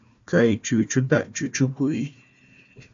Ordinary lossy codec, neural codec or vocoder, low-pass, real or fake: AAC, 64 kbps; codec, 16 kHz, 1 kbps, FunCodec, trained on Chinese and English, 50 frames a second; 7.2 kHz; fake